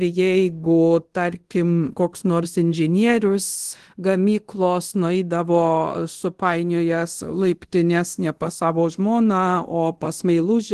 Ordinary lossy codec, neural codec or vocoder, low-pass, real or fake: Opus, 16 kbps; codec, 24 kHz, 0.5 kbps, DualCodec; 10.8 kHz; fake